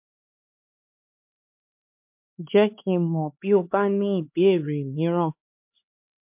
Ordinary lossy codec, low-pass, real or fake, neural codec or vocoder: MP3, 32 kbps; 3.6 kHz; fake; codec, 16 kHz, 4 kbps, X-Codec, HuBERT features, trained on LibriSpeech